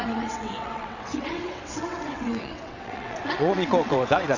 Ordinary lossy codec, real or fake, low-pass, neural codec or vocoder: none; fake; 7.2 kHz; vocoder, 22.05 kHz, 80 mel bands, WaveNeXt